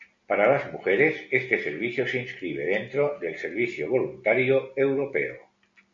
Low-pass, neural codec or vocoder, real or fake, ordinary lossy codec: 7.2 kHz; none; real; AAC, 48 kbps